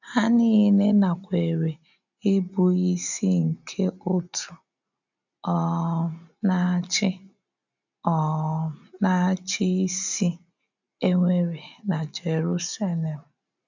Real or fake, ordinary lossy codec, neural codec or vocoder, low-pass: real; none; none; 7.2 kHz